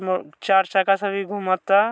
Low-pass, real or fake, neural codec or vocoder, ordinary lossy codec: none; real; none; none